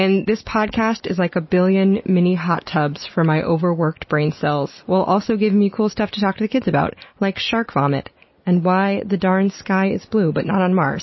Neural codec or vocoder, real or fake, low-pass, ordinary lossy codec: none; real; 7.2 kHz; MP3, 24 kbps